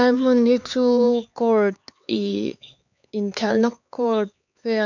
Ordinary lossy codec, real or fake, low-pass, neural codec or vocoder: none; fake; 7.2 kHz; codec, 16 kHz, 4 kbps, X-Codec, HuBERT features, trained on LibriSpeech